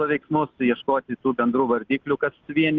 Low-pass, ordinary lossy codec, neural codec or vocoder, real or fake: 7.2 kHz; Opus, 32 kbps; none; real